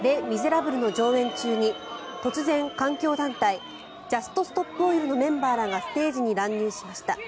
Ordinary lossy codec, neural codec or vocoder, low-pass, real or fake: none; none; none; real